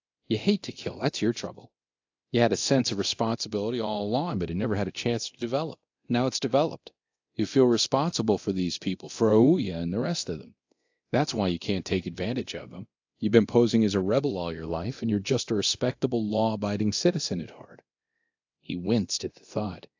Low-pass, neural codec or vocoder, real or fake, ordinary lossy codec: 7.2 kHz; codec, 24 kHz, 0.9 kbps, DualCodec; fake; AAC, 48 kbps